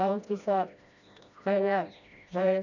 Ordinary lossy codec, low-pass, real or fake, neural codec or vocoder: none; 7.2 kHz; fake; codec, 16 kHz, 1 kbps, FreqCodec, smaller model